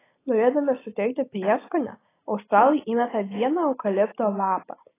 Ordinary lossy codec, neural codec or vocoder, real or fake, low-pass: AAC, 16 kbps; none; real; 3.6 kHz